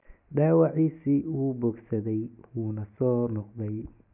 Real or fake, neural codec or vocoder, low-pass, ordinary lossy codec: real; none; 3.6 kHz; none